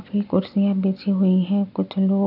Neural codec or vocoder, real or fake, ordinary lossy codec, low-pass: none; real; none; 5.4 kHz